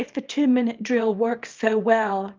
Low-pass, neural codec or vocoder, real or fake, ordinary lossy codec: 7.2 kHz; codec, 24 kHz, 0.9 kbps, WavTokenizer, small release; fake; Opus, 24 kbps